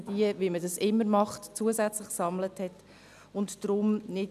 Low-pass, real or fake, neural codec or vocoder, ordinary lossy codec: 14.4 kHz; real; none; none